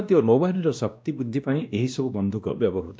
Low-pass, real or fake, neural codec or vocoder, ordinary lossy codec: none; fake; codec, 16 kHz, 1 kbps, X-Codec, WavLM features, trained on Multilingual LibriSpeech; none